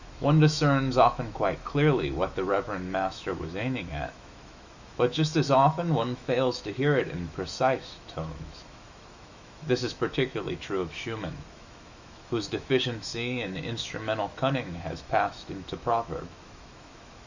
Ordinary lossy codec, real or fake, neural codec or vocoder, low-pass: Opus, 64 kbps; fake; autoencoder, 48 kHz, 128 numbers a frame, DAC-VAE, trained on Japanese speech; 7.2 kHz